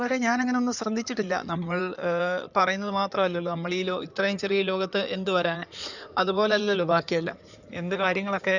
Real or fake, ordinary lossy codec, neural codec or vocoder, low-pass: fake; none; codec, 16 kHz in and 24 kHz out, 2.2 kbps, FireRedTTS-2 codec; 7.2 kHz